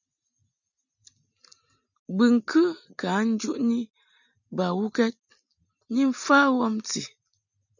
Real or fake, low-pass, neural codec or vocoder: real; 7.2 kHz; none